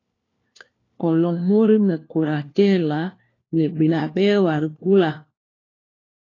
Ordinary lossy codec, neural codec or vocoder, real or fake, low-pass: AAC, 32 kbps; codec, 16 kHz, 1 kbps, FunCodec, trained on LibriTTS, 50 frames a second; fake; 7.2 kHz